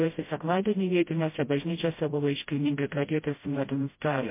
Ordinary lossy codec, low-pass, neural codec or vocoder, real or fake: MP3, 24 kbps; 3.6 kHz; codec, 16 kHz, 0.5 kbps, FreqCodec, smaller model; fake